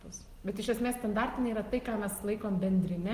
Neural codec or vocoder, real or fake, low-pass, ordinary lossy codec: none; real; 14.4 kHz; Opus, 24 kbps